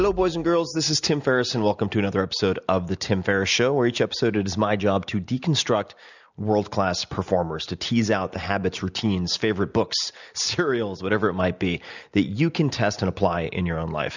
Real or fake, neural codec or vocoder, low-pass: real; none; 7.2 kHz